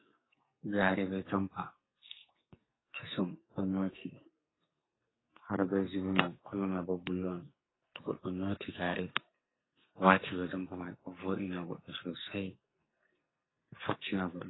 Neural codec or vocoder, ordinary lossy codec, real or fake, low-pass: codec, 44.1 kHz, 2.6 kbps, SNAC; AAC, 16 kbps; fake; 7.2 kHz